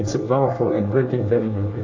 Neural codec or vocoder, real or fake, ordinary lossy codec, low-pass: codec, 24 kHz, 1 kbps, SNAC; fake; AAC, 48 kbps; 7.2 kHz